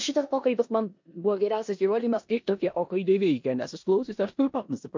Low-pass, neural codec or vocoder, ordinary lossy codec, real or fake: 7.2 kHz; codec, 16 kHz in and 24 kHz out, 0.9 kbps, LongCat-Audio-Codec, four codebook decoder; MP3, 48 kbps; fake